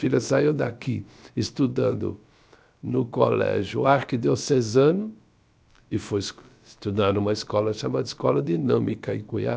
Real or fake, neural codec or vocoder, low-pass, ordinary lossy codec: fake; codec, 16 kHz, about 1 kbps, DyCAST, with the encoder's durations; none; none